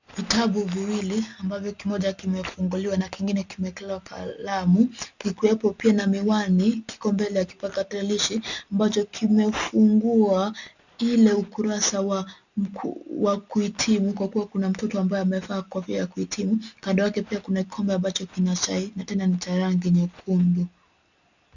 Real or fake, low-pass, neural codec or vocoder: real; 7.2 kHz; none